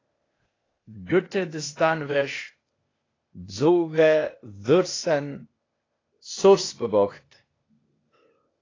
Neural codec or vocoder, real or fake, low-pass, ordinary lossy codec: codec, 16 kHz, 0.8 kbps, ZipCodec; fake; 7.2 kHz; AAC, 32 kbps